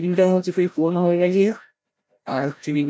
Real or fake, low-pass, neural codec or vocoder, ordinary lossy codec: fake; none; codec, 16 kHz, 0.5 kbps, FreqCodec, larger model; none